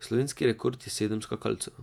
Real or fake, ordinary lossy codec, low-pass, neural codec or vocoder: fake; none; 19.8 kHz; vocoder, 48 kHz, 128 mel bands, Vocos